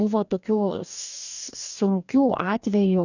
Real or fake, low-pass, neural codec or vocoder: fake; 7.2 kHz; codec, 44.1 kHz, 2.6 kbps, DAC